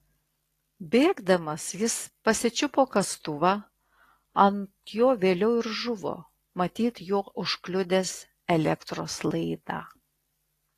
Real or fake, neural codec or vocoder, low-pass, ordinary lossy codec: real; none; 14.4 kHz; AAC, 48 kbps